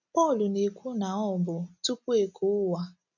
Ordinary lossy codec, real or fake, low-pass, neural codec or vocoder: none; real; 7.2 kHz; none